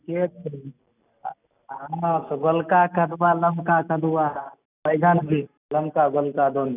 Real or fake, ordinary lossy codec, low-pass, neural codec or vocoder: real; none; 3.6 kHz; none